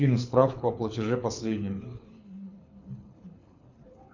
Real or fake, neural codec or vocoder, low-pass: fake; codec, 24 kHz, 6 kbps, HILCodec; 7.2 kHz